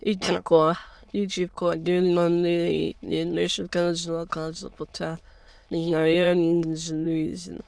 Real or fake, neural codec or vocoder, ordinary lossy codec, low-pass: fake; autoencoder, 22.05 kHz, a latent of 192 numbers a frame, VITS, trained on many speakers; none; none